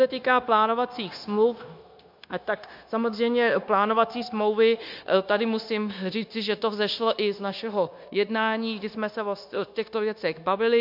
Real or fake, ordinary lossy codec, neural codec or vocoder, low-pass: fake; MP3, 48 kbps; codec, 16 kHz, 0.9 kbps, LongCat-Audio-Codec; 5.4 kHz